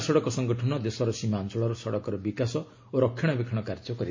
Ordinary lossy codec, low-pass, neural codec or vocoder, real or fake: MP3, 32 kbps; 7.2 kHz; none; real